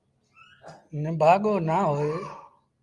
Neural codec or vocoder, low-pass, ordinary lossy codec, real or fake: none; 10.8 kHz; Opus, 32 kbps; real